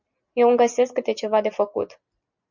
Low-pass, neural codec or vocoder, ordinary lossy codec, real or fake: 7.2 kHz; none; MP3, 64 kbps; real